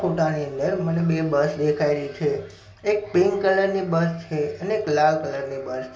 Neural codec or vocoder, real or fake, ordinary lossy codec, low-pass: none; real; none; none